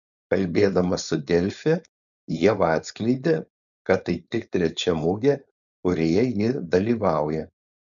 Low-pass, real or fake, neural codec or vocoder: 7.2 kHz; fake; codec, 16 kHz, 4.8 kbps, FACodec